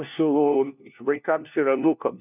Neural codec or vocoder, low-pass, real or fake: codec, 16 kHz, 1 kbps, FunCodec, trained on LibriTTS, 50 frames a second; 3.6 kHz; fake